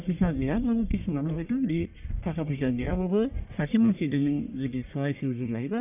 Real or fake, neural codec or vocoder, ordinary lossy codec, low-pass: fake; codec, 44.1 kHz, 1.7 kbps, Pupu-Codec; none; 3.6 kHz